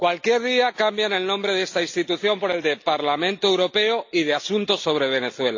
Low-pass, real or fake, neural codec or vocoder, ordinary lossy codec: 7.2 kHz; real; none; AAC, 48 kbps